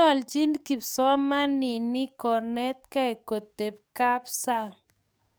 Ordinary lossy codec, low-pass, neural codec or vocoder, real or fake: none; none; codec, 44.1 kHz, 7.8 kbps, DAC; fake